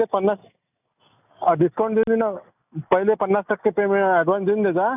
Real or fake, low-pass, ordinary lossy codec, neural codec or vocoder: real; 3.6 kHz; none; none